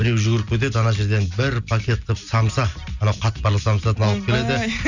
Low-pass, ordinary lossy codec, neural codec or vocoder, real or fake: 7.2 kHz; none; none; real